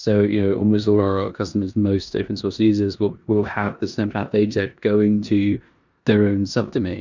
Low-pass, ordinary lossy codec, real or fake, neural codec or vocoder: 7.2 kHz; Opus, 64 kbps; fake; codec, 16 kHz in and 24 kHz out, 0.9 kbps, LongCat-Audio-Codec, four codebook decoder